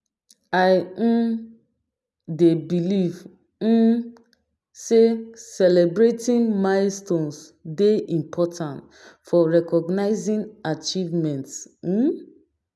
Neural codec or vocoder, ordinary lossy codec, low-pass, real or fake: none; none; none; real